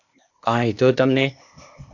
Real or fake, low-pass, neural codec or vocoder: fake; 7.2 kHz; codec, 16 kHz, 0.8 kbps, ZipCodec